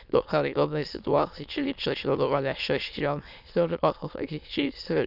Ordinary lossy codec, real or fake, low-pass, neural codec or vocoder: none; fake; 5.4 kHz; autoencoder, 22.05 kHz, a latent of 192 numbers a frame, VITS, trained on many speakers